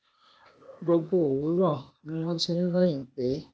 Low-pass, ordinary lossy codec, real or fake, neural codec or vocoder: none; none; fake; codec, 16 kHz, 0.8 kbps, ZipCodec